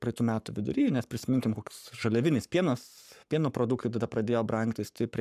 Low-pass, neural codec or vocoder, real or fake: 14.4 kHz; codec, 44.1 kHz, 7.8 kbps, Pupu-Codec; fake